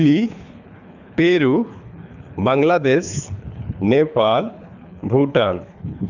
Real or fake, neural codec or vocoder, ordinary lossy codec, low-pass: fake; codec, 24 kHz, 3 kbps, HILCodec; none; 7.2 kHz